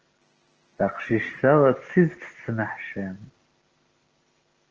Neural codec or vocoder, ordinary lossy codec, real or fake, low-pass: none; Opus, 16 kbps; real; 7.2 kHz